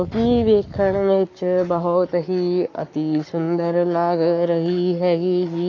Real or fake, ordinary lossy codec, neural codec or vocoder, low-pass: fake; AAC, 48 kbps; codec, 44.1 kHz, 7.8 kbps, Pupu-Codec; 7.2 kHz